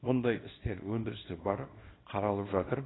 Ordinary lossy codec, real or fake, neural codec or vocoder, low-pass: AAC, 16 kbps; fake; codec, 24 kHz, 0.9 kbps, WavTokenizer, small release; 7.2 kHz